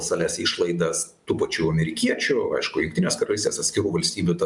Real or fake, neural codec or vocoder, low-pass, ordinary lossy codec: real; none; 10.8 kHz; MP3, 96 kbps